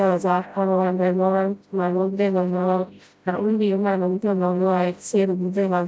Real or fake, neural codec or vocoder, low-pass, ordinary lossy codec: fake; codec, 16 kHz, 0.5 kbps, FreqCodec, smaller model; none; none